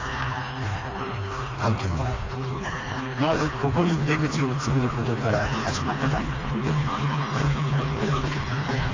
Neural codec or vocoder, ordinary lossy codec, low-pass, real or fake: codec, 16 kHz, 2 kbps, FreqCodec, smaller model; AAC, 32 kbps; 7.2 kHz; fake